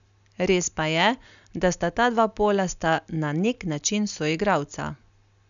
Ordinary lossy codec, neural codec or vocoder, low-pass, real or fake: none; none; 7.2 kHz; real